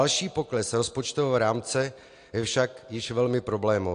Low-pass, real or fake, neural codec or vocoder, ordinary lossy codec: 9.9 kHz; real; none; AAC, 48 kbps